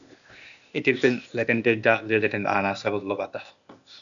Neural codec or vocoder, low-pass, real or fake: codec, 16 kHz, 0.8 kbps, ZipCodec; 7.2 kHz; fake